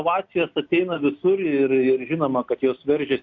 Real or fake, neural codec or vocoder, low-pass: real; none; 7.2 kHz